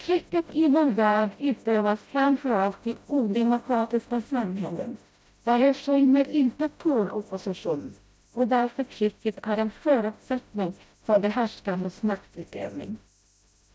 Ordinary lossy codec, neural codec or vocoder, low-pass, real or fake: none; codec, 16 kHz, 0.5 kbps, FreqCodec, smaller model; none; fake